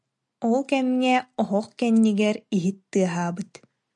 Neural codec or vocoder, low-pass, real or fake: none; 10.8 kHz; real